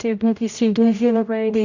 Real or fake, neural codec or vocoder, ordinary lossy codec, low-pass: fake; codec, 16 kHz, 0.5 kbps, X-Codec, HuBERT features, trained on general audio; none; 7.2 kHz